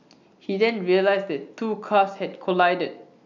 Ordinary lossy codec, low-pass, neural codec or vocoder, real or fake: none; 7.2 kHz; none; real